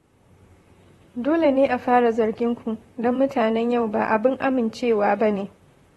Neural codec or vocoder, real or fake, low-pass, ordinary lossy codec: vocoder, 44.1 kHz, 128 mel bands, Pupu-Vocoder; fake; 19.8 kHz; AAC, 32 kbps